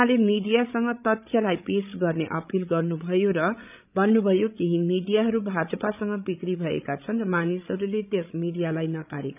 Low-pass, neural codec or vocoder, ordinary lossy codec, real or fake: 3.6 kHz; codec, 16 kHz, 16 kbps, FreqCodec, larger model; none; fake